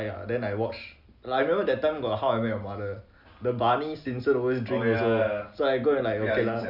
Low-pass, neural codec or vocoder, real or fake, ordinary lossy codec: 5.4 kHz; none; real; none